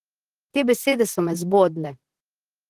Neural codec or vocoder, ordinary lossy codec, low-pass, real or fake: vocoder, 44.1 kHz, 128 mel bands, Pupu-Vocoder; Opus, 16 kbps; 14.4 kHz; fake